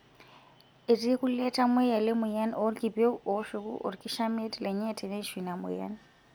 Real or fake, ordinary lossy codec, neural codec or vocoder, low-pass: real; none; none; none